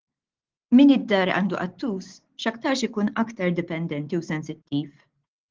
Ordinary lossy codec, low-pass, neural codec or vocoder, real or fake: Opus, 16 kbps; 7.2 kHz; none; real